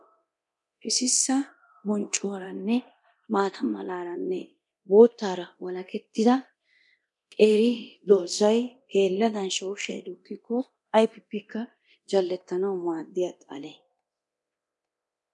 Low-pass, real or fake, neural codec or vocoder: 10.8 kHz; fake; codec, 24 kHz, 0.9 kbps, DualCodec